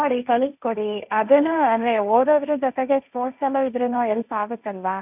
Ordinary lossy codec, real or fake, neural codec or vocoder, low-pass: none; fake; codec, 16 kHz, 1.1 kbps, Voila-Tokenizer; 3.6 kHz